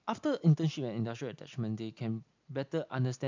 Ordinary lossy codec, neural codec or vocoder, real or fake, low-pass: MP3, 64 kbps; none; real; 7.2 kHz